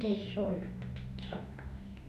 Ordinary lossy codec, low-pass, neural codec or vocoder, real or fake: none; 14.4 kHz; autoencoder, 48 kHz, 32 numbers a frame, DAC-VAE, trained on Japanese speech; fake